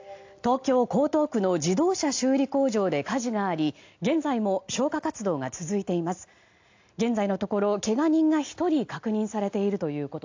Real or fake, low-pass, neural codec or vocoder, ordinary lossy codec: real; 7.2 kHz; none; AAC, 48 kbps